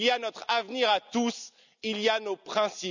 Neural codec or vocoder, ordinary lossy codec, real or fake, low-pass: none; none; real; 7.2 kHz